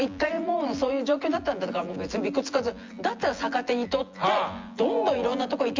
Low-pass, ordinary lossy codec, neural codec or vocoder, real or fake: 7.2 kHz; Opus, 32 kbps; vocoder, 24 kHz, 100 mel bands, Vocos; fake